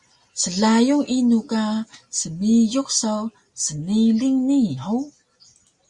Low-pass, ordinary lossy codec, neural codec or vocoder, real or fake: 10.8 kHz; Opus, 64 kbps; none; real